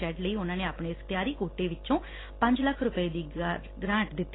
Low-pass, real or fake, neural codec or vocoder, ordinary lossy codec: 7.2 kHz; real; none; AAC, 16 kbps